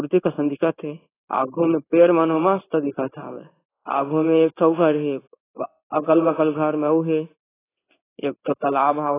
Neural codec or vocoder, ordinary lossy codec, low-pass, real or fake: codec, 16 kHz in and 24 kHz out, 1 kbps, XY-Tokenizer; AAC, 16 kbps; 3.6 kHz; fake